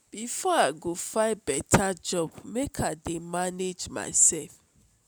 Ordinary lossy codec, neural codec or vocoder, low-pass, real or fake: none; none; none; real